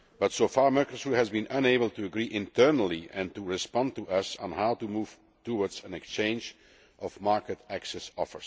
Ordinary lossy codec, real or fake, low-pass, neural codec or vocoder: none; real; none; none